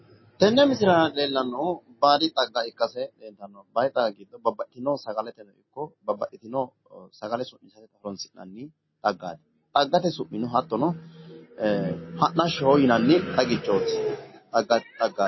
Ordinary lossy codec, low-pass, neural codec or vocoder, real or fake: MP3, 24 kbps; 7.2 kHz; none; real